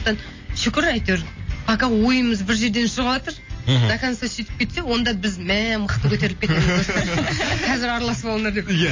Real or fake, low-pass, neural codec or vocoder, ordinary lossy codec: real; 7.2 kHz; none; MP3, 32 kbps